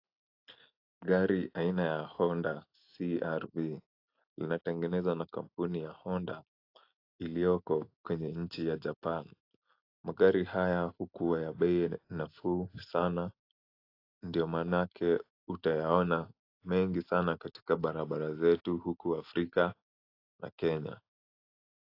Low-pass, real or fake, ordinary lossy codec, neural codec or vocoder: 5.4 kHz; real; AAC, 32 kbps; none